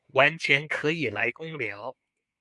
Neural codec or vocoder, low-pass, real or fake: codec, 24 kHz, 1 kbps, SNAC; 10.8 kHz; fake